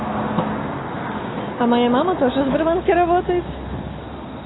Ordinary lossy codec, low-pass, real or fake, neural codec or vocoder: AAC, 16 kbps; 7.2 kHz; real; none